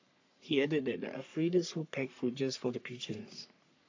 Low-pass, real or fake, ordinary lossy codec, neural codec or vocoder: 7.2 kHz; fake; AAC, 48 kbps; codec, 44.1 kHz, 3.4 kbps, Pupu-Codec